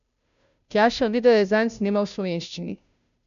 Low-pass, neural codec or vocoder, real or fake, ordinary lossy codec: 7.2 kHz; codec, 16 kHz, 0.5 kbps, FunCodec, trained on Chinese and English, 25 frames a second; fake; none